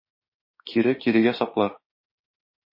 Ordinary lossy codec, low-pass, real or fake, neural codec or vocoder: MP3, 24 kbps; 5.4 kHz; fake; autoencoder, 48 kHz, 32 numbers a frame, DAC-VAE, trained on Japanese speech